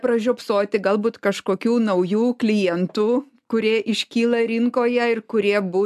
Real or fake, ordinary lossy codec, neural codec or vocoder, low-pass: real; AAC, 96 kbps; none; 14.4 kHz